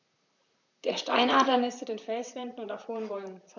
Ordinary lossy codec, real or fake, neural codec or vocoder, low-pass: none; fake; vocoder, 44.1 kHz, 128 mel bands, Pupu-Vocoder; 7.2 kHz